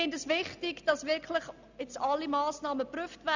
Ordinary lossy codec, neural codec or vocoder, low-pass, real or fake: Opus, 64 kbps; none; 7.2 kHz; real